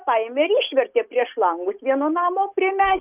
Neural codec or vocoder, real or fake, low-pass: none; real; 3.6 kHz